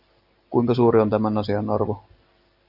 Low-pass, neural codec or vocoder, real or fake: 5.4 kHz; none; real